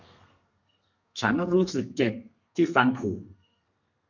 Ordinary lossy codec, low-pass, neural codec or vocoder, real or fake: none; 7.2 kHz; codec, 32 kHz, 1.9 kbps, SNAC; fake